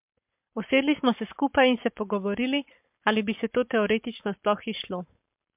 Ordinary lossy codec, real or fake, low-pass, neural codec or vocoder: MP3, 32 kbps; fake; 3.6 kHz; codec, 44.1 kHz, 7.8 kbps, Pupu-Codec